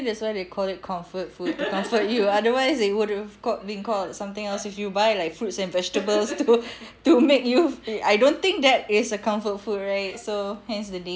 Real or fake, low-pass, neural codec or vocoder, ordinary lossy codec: real; none; none; none